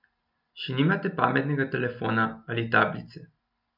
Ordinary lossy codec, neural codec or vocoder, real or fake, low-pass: none; none; real; 5.4 kHz